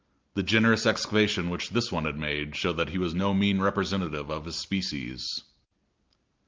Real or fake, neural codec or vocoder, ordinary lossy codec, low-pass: real; none; Opus, 24 kbps; 7.2 kHz